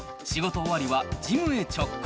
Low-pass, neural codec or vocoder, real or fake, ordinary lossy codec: none; none; real; none